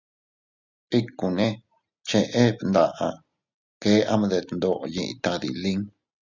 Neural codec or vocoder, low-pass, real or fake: none; 7.2 kHz; real